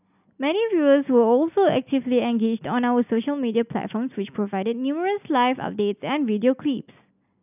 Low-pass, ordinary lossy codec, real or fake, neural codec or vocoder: 3.6 kHz; none; real; none